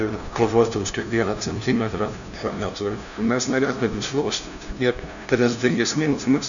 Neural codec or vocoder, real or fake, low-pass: codec, 16 kHz, 0.5 kbps, FunCodec, trained on LibriTTS, 25 frames a second; fake; 7.2 kHz